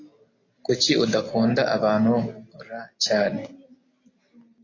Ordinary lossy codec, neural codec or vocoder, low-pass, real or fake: AAC, 32 kbps; none; 7.2 kHz; real